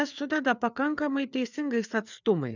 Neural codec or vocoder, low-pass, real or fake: codec, 16 kHz, 4 kbps, FreqCodec, larger model; 7.2 kHz; fake